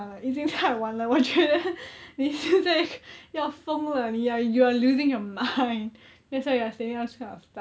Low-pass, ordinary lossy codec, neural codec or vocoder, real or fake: none; none; none; real